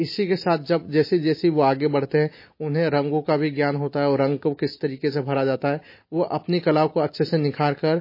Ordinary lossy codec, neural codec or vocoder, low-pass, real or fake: MP3, 24 kbps; none; 5.4 kHz; real